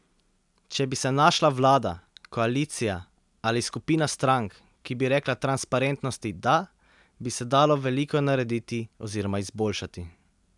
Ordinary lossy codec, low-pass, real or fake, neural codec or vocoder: none; 10.8 kHz; real; none